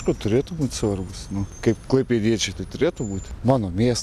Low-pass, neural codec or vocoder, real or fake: 14.4 kHz; none; real